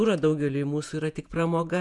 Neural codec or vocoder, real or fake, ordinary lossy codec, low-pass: vocoder, 48 kHz, 128 mel bands, Vocos; fake; MP3, 96 kbps; 10.8 kHz